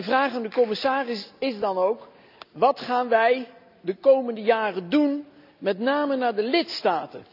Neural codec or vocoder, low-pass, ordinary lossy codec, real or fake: none; 5.4 kHz; none; real